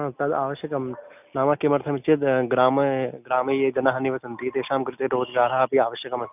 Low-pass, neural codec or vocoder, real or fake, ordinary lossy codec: 3.6 kHz; none; real; none